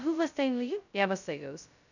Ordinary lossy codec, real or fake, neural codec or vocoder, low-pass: none; fake; codec, 16 kHz, 0.2 kbps, FocalCodec; 7.2 kHz